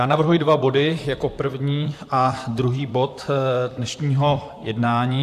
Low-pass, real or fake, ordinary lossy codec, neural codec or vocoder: 14.4 kHz; fake; AAC, 96 kbps; vocoder, 44.1 kHz, 128 mel bands, Pupu-Vocoder